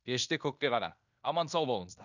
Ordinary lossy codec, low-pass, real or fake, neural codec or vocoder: none; 7.2 kHz; fake; codec, 16 kHz in and 24 kHz out, 0.9 kbps, LongCat-Audio-Codec, fine tuned four codebook decoder